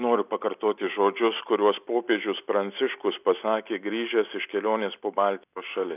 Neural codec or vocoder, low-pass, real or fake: none; 3.6 kHz; real